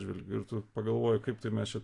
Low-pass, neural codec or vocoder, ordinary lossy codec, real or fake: 10.8 kHz; none; AAC, 48 kbps; real